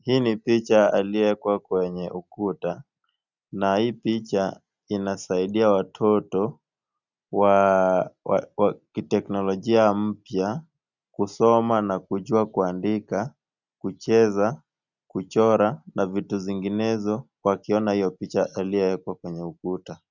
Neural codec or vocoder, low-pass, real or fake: none; 7.2 kHz; real